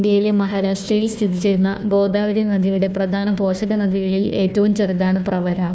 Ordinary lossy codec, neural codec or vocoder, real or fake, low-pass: none; codec, 16 kHz, 1 kbps, FunCodec, trained on Chinese and English, 50 frames a second; fake; none